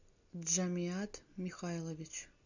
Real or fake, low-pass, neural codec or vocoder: real; 7.2 kHz; none